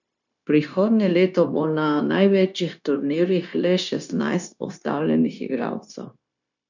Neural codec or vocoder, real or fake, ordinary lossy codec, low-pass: codec, 16 kHz, 0.9 kbps, LongCat-Audio-Codec; fake; none; 7.2 kHz